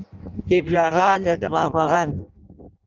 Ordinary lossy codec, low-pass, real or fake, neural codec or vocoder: Opus, 24 kbps; 7.2 kHz; fake; codec, 16 kHz in and 24 kHz out, 0.6 kbps, FireRedTTS-2 codec